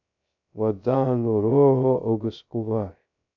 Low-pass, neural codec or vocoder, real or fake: 7.2 kHz; codec, 16 kHz, 0.2 kbps, FocalCodec; fake